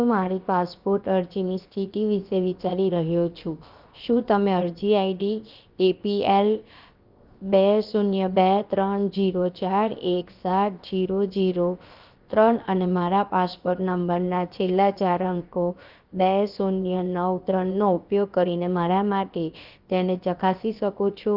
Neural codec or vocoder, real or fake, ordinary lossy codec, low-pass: codec, 16 kHz, 0.7 kbps, FocalCodec; fake; Opus, 24 kbps; 5.4 kHz